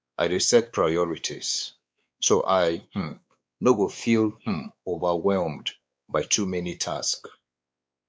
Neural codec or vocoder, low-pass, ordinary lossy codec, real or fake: codec, 16 kHz, 4 kbps, X-Codec, WavLM features, trained on Multilingual LibriSpeech; none; none; fake